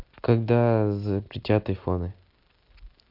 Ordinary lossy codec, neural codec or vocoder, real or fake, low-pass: MP3, 48 kbps; none; real; 5.4 kHz